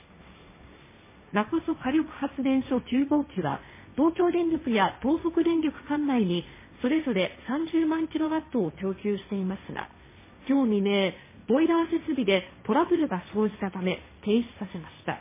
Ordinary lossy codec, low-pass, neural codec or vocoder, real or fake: MP3, 16 kbps; 3.6 kHz; codec, 16 kHz, 1.1 kbps, Voila-Tokenizer; fake